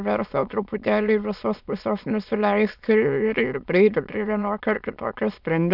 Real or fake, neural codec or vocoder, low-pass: fake; autoencoder, 22.05 kHz, a latent of 192 numbers a frame, VITS, trained on many speakers; 5.4 kHz